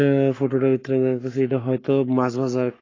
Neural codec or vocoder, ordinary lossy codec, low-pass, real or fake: codec, 44.1 kHz, 7.8 kbps, Pupu-Codec; AAC, 32 kbps; 7.2 kHz; fake